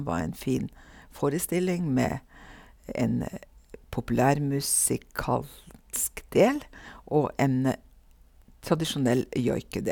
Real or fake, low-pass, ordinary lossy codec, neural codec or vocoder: real; none; none; none